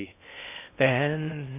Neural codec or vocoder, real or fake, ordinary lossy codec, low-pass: codec, 16 kHz in and 24 kHz out, 0.6 kbps, FocalCodec, streaming, 2048 codes; fake; none; 3.6 kHz